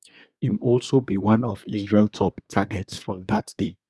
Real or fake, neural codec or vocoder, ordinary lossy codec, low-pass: fake; codec, 24 kHz, 1 kbps, SNAC; none; none